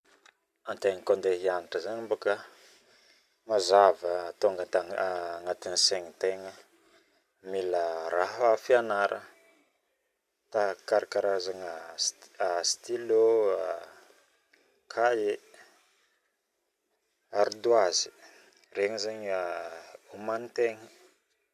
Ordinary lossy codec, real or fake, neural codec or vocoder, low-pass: none; real; none; 14.4 kHz